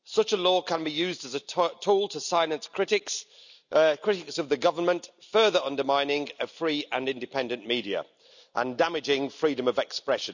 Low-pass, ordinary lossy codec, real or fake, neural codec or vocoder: 7.2 kHz; none; real; none